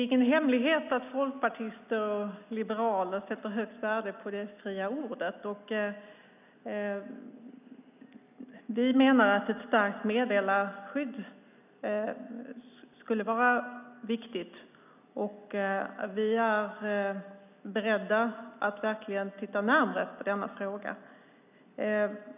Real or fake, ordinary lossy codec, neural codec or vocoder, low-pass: real; none; none; 3.6 kHz